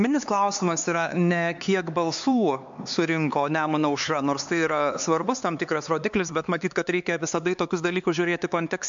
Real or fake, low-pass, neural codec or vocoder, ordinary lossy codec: fake; 7.2 kHz; codec, 16 kHz, 4 kbps, X-Codec, HuBERT features, trained on LibriSpeech; MP3, 64 kbps